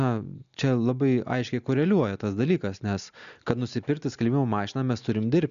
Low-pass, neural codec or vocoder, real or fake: 7.2 kHz; none; real